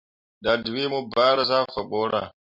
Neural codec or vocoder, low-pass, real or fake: none; 5.4 kHz; real